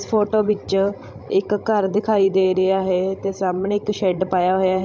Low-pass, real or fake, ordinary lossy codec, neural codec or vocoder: none; fake; none; codec, 16 kHz, 16 kbps, FreqCodec, larger model